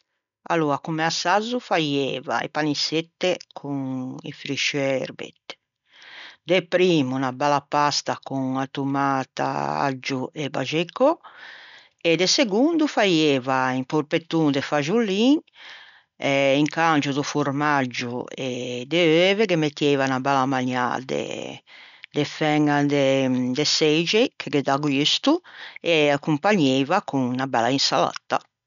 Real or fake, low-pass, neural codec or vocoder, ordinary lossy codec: real; 7.2 kHz; none; none